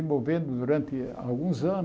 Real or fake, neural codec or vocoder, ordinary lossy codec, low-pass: real; none; none; none